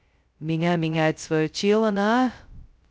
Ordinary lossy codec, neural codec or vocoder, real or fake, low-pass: none; codec, 16 kHz, 0.2 kbps, FocalCodec; fake; none